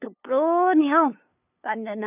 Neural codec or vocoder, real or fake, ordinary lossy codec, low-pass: codec, 16 kHz, 2 kbps, FunCodec, trained on LibriTTS, 25 frames a second; fake; none; 3.6 kHz